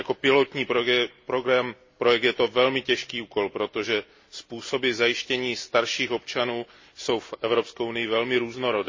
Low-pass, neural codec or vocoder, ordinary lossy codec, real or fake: 7.2 kHz; none; MP3, 32 kbps; real